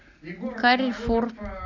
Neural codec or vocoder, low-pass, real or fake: none; 7.2 kHz; real